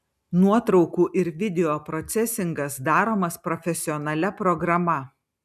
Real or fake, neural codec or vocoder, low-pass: real; none; 14.4 kHz